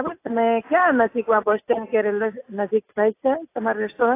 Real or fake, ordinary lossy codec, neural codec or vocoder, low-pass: real; AAC, 24 kbps; none; 3.6 kHz